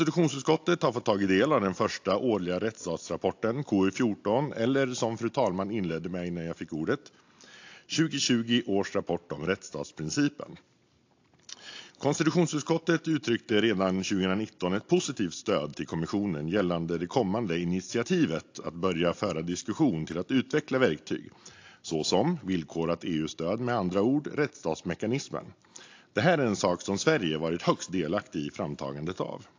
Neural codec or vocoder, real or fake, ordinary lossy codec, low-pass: none; real; AAC, 48 kbps; 7.2 kHz